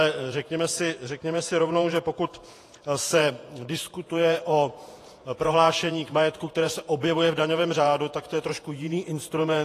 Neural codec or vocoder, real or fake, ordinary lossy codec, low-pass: vocoder, 48 kHz, 128 mel bands, Vocos; fake; AAC, 48 kbps; 14.4 kHz